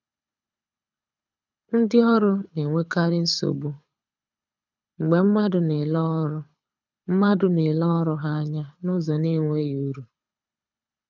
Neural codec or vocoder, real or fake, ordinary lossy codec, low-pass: codec, 24 kHz, 6 kbps, HILCodec; fake; none; 7.2 kHz